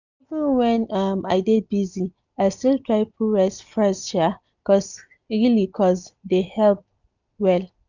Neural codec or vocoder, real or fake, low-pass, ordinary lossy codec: none; real; 7.2 kHz; none